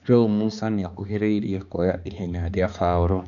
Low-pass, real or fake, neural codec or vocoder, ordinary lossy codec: 7.2 kHz; fake; codec, 16 kHz, 2 kbps, X-Codec, HuBERT features, trained on balanced general audio; none